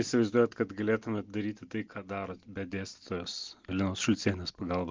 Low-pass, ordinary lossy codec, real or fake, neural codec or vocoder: 7.2 kHz; Opus, 24 kbps; real; none